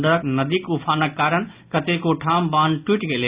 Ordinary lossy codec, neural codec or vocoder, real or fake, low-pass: Opus, 64 kbps; none; real; 3.6 kHz